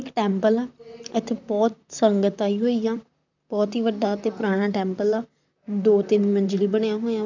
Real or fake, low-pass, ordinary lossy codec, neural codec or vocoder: fake; 7.2 kHz; none; vocoder, 44.1 kHz, 128 mel bands, Pupu-Vocoder